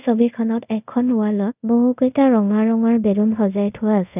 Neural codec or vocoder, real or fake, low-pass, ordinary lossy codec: codec, 24 kHz, 0.5 kbps, DualCodec; fake; 3.6 kHz; none